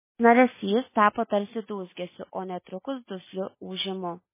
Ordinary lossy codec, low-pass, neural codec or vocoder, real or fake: MP3, 16 kbps; 3.6 kHz; none; real